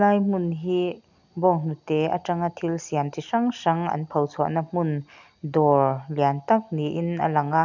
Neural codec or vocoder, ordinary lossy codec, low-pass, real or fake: none; none; 7.2 kHz; real